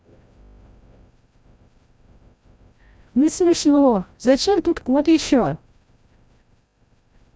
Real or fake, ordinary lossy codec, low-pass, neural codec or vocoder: fake; none; none; codec, 16 kHz, 0.5 kbps, FreqCodec, larger model